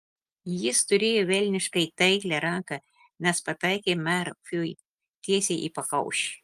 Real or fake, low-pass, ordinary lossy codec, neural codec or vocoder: real; 14.4 kHz; Opus, 32 kbps; none